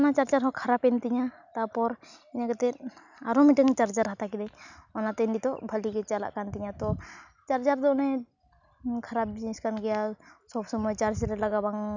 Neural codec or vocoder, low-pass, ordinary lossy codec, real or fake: none; 7.2 kHz; none; real